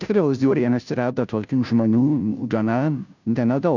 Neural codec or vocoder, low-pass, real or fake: codec, 16 kHz, 0.5 kbps, FunCodec, trained on Chinese and English, 25 frames a second; 7.2 kHz; fake